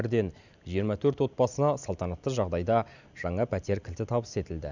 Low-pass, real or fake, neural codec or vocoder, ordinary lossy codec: 7.2 kHz; real; none; none